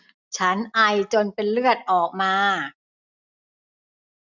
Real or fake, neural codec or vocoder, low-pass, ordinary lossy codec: real; none; 7.2 kHz; none